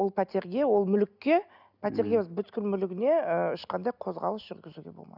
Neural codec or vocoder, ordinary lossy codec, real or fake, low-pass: none; none; real; 5.4 kHz